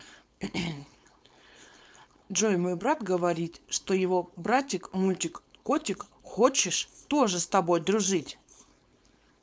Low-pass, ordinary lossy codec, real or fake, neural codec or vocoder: none; none; fake; codec, 16 kHz, 4.8 kbps, FACodec